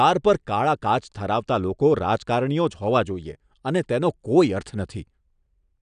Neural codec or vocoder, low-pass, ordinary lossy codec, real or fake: none; 9.9 kHz; none; real